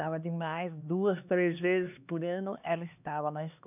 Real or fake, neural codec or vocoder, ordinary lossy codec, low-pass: fake; codec, 16 kHz, 2 kbps, X-Codec, HuBERT features, trained on LibriSpeech; none; 3.6 kHz